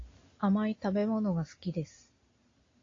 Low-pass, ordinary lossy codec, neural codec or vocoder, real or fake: 7.2 kHz; AAC, 32 kbps; none; real